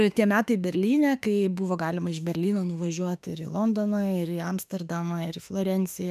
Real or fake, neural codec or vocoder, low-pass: fake; autoencoder, 48 kHz, 32 numbers a frame, DAC-VAE, trained on Japanese speech; 14.4 kHz